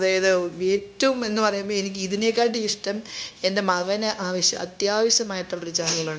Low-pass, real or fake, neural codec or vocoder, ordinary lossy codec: none; fake; codec, 16 kHz, 0.9 kbps, LongCat-Audio-Codec; none